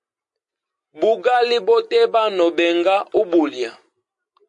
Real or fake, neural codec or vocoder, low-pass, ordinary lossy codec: real; none; 10.8 kHz; MP3, 48 kbps